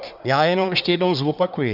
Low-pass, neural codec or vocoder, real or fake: 5.4 kHz; codec, 24 kHz, 1 kbps, SNAC; fake